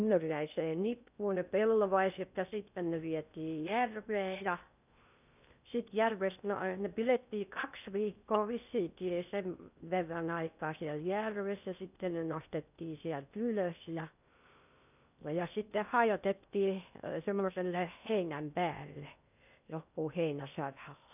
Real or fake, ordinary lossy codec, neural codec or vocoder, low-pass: fake; none; codec, 16 kHz in and 24 kHz out, 0.6 kbps, FocalCodec, streaming, 2048 codes; 3.6 kHz